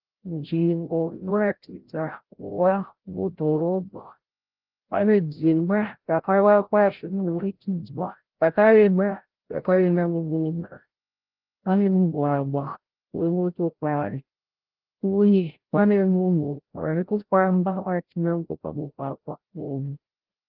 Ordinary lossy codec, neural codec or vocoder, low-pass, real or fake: Opus, 16 kbps; codec, 16 kHz, 0.5 kbps, FreqCodec, larger model; 5.4 kHz; fake